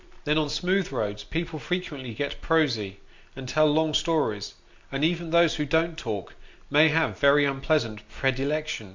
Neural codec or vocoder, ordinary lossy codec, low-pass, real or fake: none; MP3, 64 kbps; 7.2 kHz; real